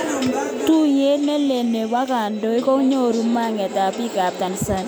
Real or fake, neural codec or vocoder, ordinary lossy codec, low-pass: real; none; none; none